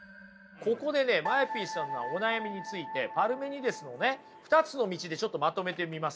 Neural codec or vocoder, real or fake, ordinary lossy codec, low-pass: none; real; none; none